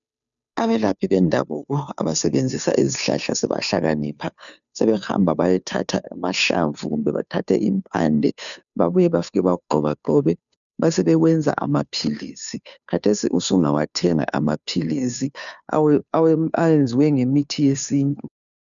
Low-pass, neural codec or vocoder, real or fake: 7.2 kHz; codec, 16 kHz, 2 kbps, FunCodec, trained on Chinese and English, 25 frames a second; fake